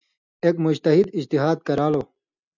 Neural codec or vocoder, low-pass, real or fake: none; 7.2 kHz; real